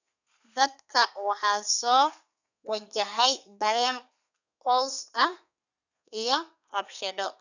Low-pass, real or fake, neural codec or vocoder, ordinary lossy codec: 7.2 kHz; fake; codec, 32 kHz, 1.9 kbps, SNAC; none